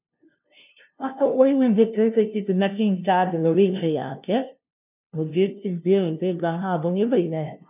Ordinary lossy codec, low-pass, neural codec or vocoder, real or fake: none; 3.6 kHz; codec, 16 kHz, 0.5 kbps, FunCodec, trained on LibriTTS, 25 frames a second; fake